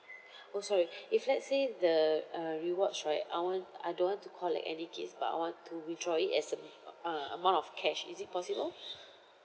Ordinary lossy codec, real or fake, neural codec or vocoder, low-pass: none; real; none; none